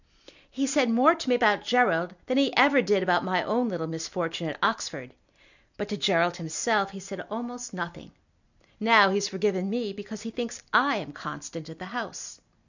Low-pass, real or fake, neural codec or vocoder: 7.2 kHz; real; none